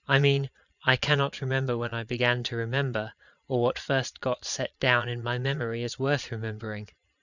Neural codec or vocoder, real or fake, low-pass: vocoder, 22.05 kHz, 80 mel bands, Vocos; fake; 7.2 kHz